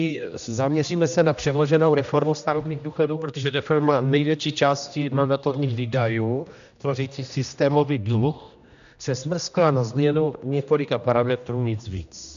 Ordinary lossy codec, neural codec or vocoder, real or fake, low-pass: AAC, 96 kbps; codec, 16 kHz, 1 kbps, X-Codec, HuBERT features, trained on general audio; fake; 7.2 kHz